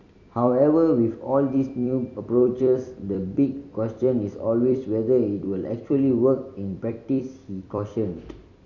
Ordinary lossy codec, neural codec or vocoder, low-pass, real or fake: none; vocoder, 44.1 kHz, 128 mel bands every 256 samples, BigVGAN v2; 7.2 kHz; fake